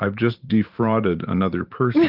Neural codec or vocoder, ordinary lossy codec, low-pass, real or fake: vocoder, 44.1 kHz, 128 mel bands every 512 samples, BigVGAN v2; Opus, 32 kbps; 5.4 kHz; fake